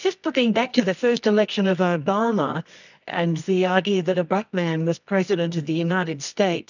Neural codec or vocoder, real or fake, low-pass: codec, 24 kHz, 0.9 kbps, WavTokenizer, medium music audio release; fake; 7.2 kHz